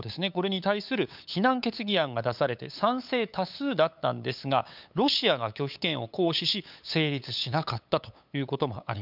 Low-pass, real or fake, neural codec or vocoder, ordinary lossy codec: 5.4 kHz; fake; codec, 16 kHz, 8 kbps, FunCodec, trained on LibriTTS, 25 frames a second; none